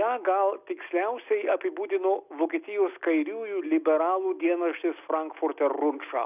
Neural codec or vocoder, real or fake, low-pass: none; real; 3.6 kHz